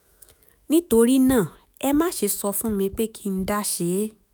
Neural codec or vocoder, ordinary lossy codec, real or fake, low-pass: autoencoder, 48 kHz, 128 numbers a frame, DAC-VAE, trained on Japanese speech; none; fake; none